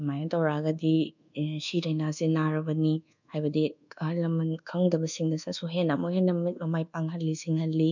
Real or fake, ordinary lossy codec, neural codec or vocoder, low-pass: fake; none; codec, 24 kHz, 1.2 kbps, DualCodec; 7.2 kHz